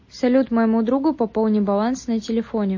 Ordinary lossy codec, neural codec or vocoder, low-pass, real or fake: MP3, 32 kbps; none; 7.2 kHz; real